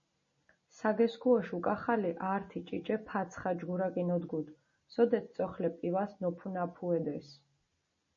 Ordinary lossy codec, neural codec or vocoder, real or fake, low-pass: MP3, 32 kbps; none; real; 7.2 kHz